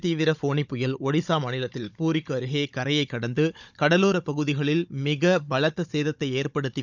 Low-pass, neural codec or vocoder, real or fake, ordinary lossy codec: 7.2 kHz; codec, 16 kHz, 16 kbps, FunCodec, trained on Chinese and English, 50 frames a second; fake; none